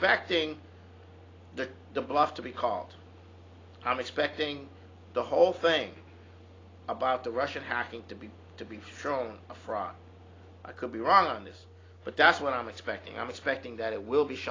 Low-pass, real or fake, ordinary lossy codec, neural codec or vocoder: 7.2 kHz; real; AAC, 32 kbps; none